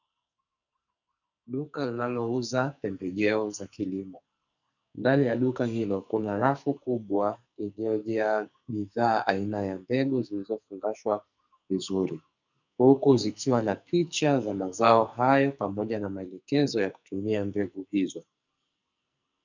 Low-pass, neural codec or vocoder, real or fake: 7.2 kHz; codec, 44.1 kHz, 2.6 kbps, SNAC; fake